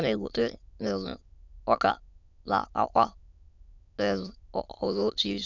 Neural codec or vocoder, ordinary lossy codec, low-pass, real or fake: autoencoder, 22.05 kHz, a latent of 192 numbers a frame, VITS, trained on many speakers; none; 7.2 kHz; fake